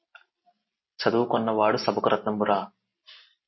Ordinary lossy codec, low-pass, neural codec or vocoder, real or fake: MP3, 24 kbps; 7.2 kHz; none; real